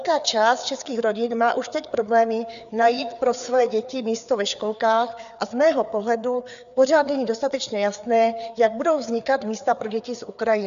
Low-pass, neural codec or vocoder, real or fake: 7.2 kHz; codec, 16 kHz, 4 kbps, FreqCodec, larger model; fake